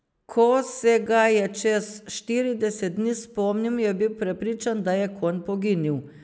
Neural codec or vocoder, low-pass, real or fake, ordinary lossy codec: none; none; real; none